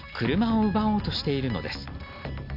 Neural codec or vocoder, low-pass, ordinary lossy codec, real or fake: none; 5.4 kHz; none; real